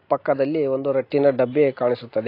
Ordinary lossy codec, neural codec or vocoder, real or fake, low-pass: AAC, 32 kbps; none; real; 5.4 kHz